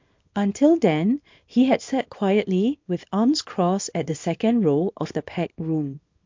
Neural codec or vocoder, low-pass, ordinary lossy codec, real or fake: codec, 24 kHz, 0.9 kbps, WavTokenizer, small release; 7.2 kHz; MP3, 48 kbps; fake